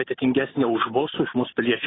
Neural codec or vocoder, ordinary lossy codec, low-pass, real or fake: none; AAC, 16 kbps; 7.2 kHz; real